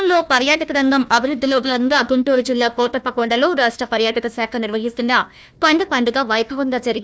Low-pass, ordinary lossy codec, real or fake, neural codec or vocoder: none; none; fake; codec, 16 kHz, 1 kbps, FunCodec, trained on Chinese and English, 50 frames a second